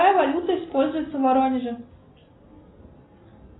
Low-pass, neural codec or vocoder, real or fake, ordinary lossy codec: 7.2 kHz; none; real; AAC, 16 kbps